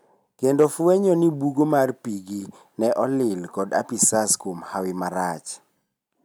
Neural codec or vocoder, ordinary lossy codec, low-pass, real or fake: none; none; none; real